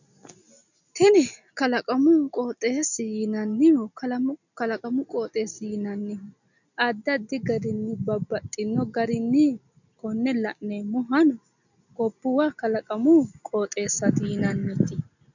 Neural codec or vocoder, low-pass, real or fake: none; 7.2 kHz; real